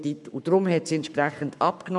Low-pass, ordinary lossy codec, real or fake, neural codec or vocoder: 10.8 kHz; none; fake; codec, 44.1 kHz, 7.8 kbps, Pupu-Codec